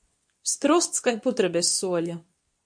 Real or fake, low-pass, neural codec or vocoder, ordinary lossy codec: fake; 9.9 kHz; codec, 24 kHz, 0.9 kbps, WavTokenizer, medium speech release version 2; MP3, 64 kbps